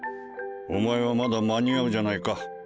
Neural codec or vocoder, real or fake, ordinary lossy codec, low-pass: none; real; none; none